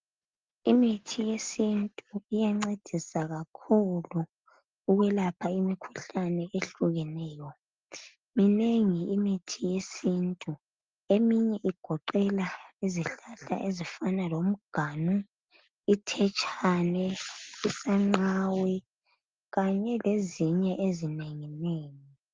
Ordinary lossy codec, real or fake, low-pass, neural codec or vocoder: Opus, 32 kbps; real; 7.2 kHz; none